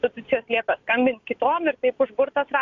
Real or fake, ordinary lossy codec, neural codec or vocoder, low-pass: real; AAC, 64 kbps; none; 7.2 kHz